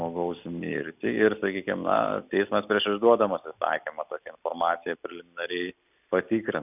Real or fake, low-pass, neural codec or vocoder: real; 3.6 kHz; none